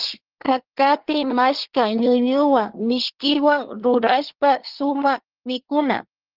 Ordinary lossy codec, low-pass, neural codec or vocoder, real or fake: Opus, 16 kbps; 5.4 kHz; codec, 24 kHz, 1 kbps, SNAC; fake